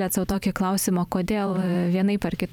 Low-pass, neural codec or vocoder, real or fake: 19.8 kHz; vocoder, 44.1 kHz, 128 mel bands every 512 samples, BigVGAN v2; fake